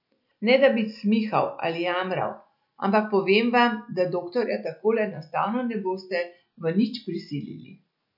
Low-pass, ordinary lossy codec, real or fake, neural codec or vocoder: 5.4 kHz; none; real; none